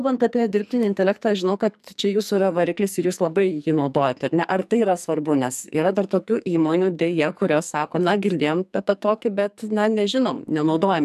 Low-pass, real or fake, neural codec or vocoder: 14.4 kHz; fake; codec, 44.1 kHz, 2.6 kbps, SNAC